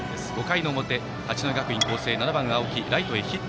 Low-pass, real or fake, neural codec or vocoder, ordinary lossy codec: none; real; none; none